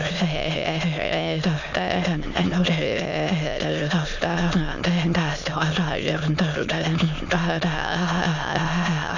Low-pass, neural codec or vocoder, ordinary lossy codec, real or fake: 7.2 kHz; autoencoder, 22.05 kHz, a latent of 192 numbers a frame, VITS, trained on many speakers; none; fake